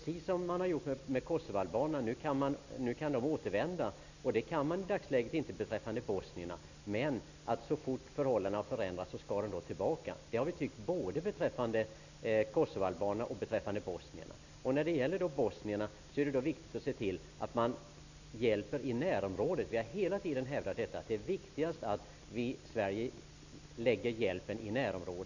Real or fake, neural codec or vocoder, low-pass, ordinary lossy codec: real; none; 7.2 kHz; none